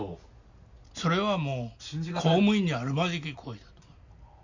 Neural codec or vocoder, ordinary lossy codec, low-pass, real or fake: none; none; 7.2 kHz; real